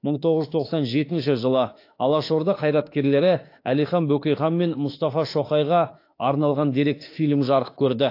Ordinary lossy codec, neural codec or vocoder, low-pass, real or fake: AAC, 32 kbps; autoencoder, 48 kHz, 32 numbers a frame, DAC-VAE, trained on Japanese speech; 5.4 kHz; fake